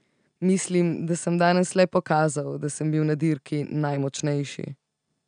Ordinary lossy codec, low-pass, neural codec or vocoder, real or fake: none; 9.9 kHz; none; real